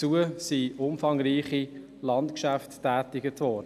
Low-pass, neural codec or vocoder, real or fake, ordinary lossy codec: 14.4 kHz; none; real; none